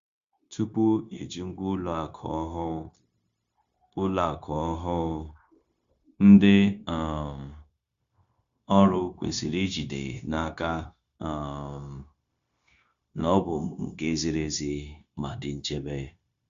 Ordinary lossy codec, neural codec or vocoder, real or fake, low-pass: Opus, 64 kbps; codec, 16 kHz, 0.9 kbps, LongCat-Audio-Codec; fake; 7.2 kHz